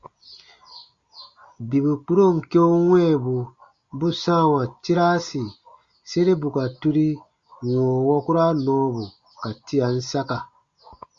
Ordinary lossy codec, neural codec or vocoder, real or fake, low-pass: Opus, 64 kbps; none; real; 7.2 kHz